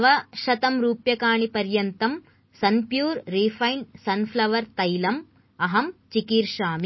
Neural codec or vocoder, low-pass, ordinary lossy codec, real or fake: none; 7.2 kHz; MP3, 24 kbps; real